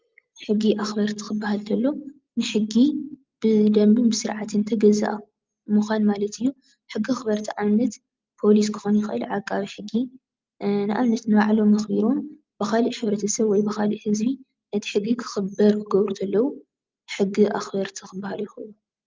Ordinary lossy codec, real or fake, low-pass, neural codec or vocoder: Opus, 32 kbps; real; 7.2 kHz; none